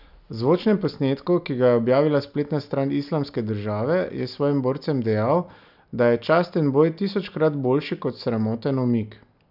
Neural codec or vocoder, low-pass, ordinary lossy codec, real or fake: none; 5.4 kHz; none; real